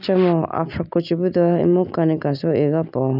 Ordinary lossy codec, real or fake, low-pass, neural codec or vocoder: none; real; 5.4 kHz; none